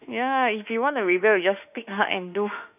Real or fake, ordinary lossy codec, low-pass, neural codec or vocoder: fake; none; 3.6 kHz; autoencoder, 48 kHz, 32 numbers a frame, DAC-VAE, trained on Japanese speech